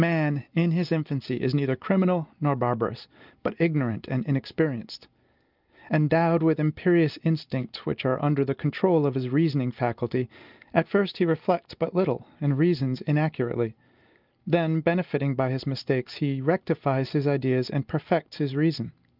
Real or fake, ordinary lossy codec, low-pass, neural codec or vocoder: real; Opus, 24 kbps; 5.4 kHz; none